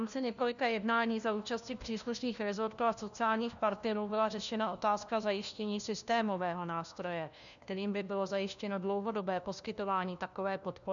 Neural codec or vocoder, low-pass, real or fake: codec, 16 kHz, 1 kbps, FunCodec, trained on LibriTTS, 50 frames a second; 7.2 kHz; fake